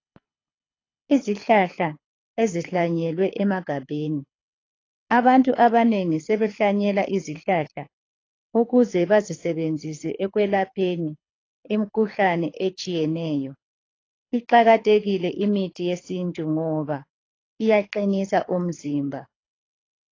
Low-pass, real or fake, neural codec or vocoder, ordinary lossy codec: 7.2 kHz; fake; codec, 24 kHz, 6 kbps, HILCodec; AAC, 32 kbps